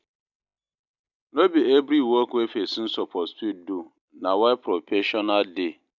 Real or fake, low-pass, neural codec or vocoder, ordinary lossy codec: real; 7.2 kHz; none; none